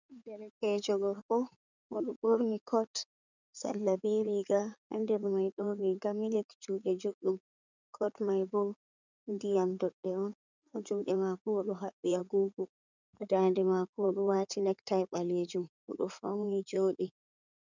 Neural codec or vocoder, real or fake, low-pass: codec, 16 kHz in and 24 kHz out, 2.2 kbps, FireRedTTS-2 codec; fake; 7.2 kHz